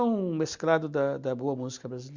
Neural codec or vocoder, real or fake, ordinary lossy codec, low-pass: none; real; Opus, 64 kbps; 7.2 kHz